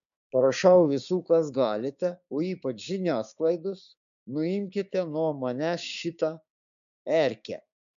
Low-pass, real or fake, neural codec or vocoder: 7.2 kHz; fake; codec, 16 kHz, 6 kbps, DAC